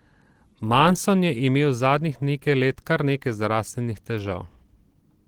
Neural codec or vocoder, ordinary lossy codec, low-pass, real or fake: none; Opus, 16 kbps; 19.8 kHz; real